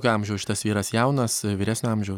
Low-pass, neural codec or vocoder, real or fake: 19.8 kHz; none; real